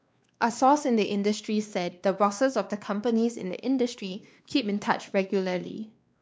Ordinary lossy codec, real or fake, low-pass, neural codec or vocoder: none; fake; none; codec, 16 kHz, 2 kbps, X-Codec, WavLM features, trained on Multilingual LibriSpeech